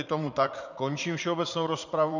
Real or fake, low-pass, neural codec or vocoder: fake; 7.2 kHz; vocoder, 44.1 kHz, 80 mel bands, Vocos